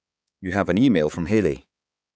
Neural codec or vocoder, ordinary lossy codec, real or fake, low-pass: codec, 16 kHz, 4 kbps, X-Codec, HuBERT features, trained on balanced general audio; none; fake; none